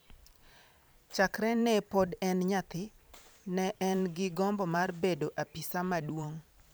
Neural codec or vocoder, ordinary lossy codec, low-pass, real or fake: vocoder, 44.1 kHz, 128 mel bands every 512 samples, BigVGAN v2; none; none; fake